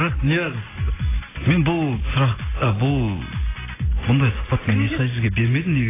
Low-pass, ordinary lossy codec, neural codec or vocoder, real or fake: 3.6 kHz; AAC, 16 kbps; none; real